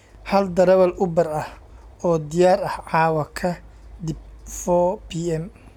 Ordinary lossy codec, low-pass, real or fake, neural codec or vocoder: none; 19.8 kHz; real; none